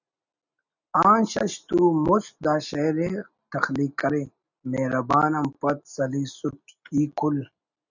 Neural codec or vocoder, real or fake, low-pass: none; real; 7.2 kHz